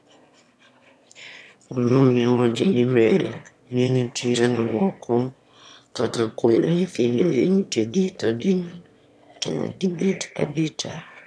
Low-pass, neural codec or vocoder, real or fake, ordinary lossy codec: none; autoencoder, 22.05 kHz, a latent of 192 numbers a frame, VITS, trained on one speaker; fake; none